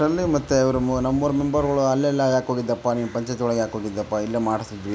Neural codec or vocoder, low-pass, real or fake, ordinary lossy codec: none; none; real; none